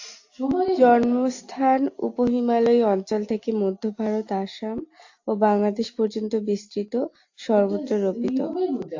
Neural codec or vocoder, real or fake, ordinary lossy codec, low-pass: none; real; AAC, 48 kbps; 7.2 kHz